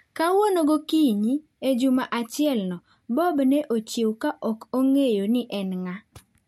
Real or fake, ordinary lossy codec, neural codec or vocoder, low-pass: real; MP3, 64 kbps; none; 19.8 kHz